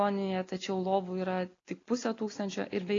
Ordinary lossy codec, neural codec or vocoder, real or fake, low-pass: AAC, 32 kbps; none; real; 7.2 kHz